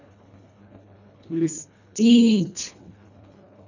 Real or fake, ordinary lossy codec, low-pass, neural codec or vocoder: fake; none; 7.2 kHz; codec, 24 kHz, 1.5 kbps, HILCodec